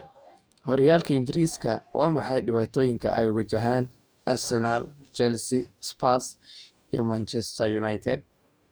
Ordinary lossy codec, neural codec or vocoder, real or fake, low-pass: none; codec, 44.1 kHz, 2.6 kbps, DAC; fake; none